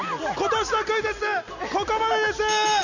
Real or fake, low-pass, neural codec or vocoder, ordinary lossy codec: real; 7.2 kHz; none; none